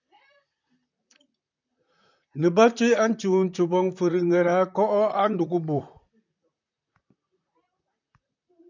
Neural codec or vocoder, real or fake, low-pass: vocoder, 44.1 kHz, 128 mel bands, Pupu-Vocoder; fake; 7.2 kHz